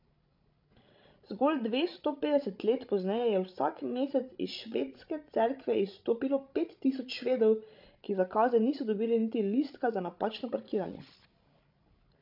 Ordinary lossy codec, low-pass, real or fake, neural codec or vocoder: MP3, 48 kbps; 5.4 kHz; fake; codec, 16 kHz, 16 kbps, FreqCodec, larger model